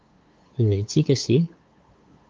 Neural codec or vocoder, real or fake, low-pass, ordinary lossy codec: codec, 16 kHz, 8 kbps, FunCodec, trained on LibriTTS, 25 frames a second; fake; 7.2 kHz; Opus, 24 kbps